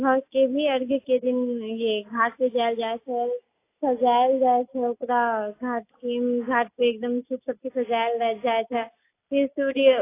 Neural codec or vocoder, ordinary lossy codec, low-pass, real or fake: none; AAC, 24 kbps; 3.6 kHz; real